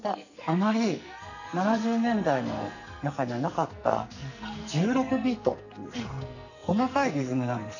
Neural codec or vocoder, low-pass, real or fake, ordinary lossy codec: codec, 44.1 kHz, 2.6 kbps, SNAC; 7.2 kHz; fake; none